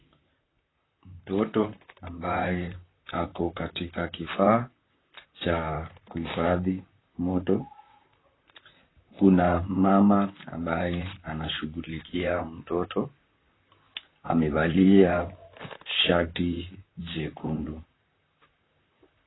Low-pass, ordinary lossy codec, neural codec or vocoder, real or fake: 7.2 kHz; AAC, 16 kbps; vocoder, 44.1 kHz, 128 mel bands, Pupu-Vocoder; fake